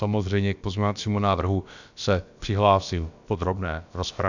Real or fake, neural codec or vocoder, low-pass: fake; codec, 16 kHz, about 1 kbps, DyCAST, with the encoder's durations; 7.2 kHz